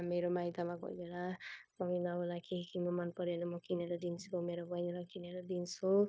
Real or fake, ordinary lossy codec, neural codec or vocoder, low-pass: fake; none; codec, 16 kHz, 0.9 kbps, LongCat-Audio-Codec; none